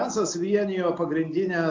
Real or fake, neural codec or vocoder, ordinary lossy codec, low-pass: real; none; AAC, 48 kbps; 7.2 kHz